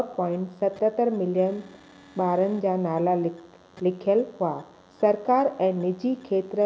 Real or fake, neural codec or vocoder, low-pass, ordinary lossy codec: real; none; none; none